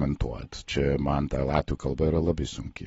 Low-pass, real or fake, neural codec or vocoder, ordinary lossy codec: 19.8 kHz; real; none; AAC, 24 kbps